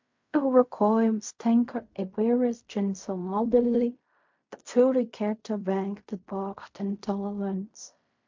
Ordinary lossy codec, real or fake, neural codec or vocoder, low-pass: MP3, 48 kbps; fake; codec, 16 kHz in and 24 kHz out, 0.4 kbps, LongCat-Audio-Codec, fine tuned four codebook decoder; 7.2 kHz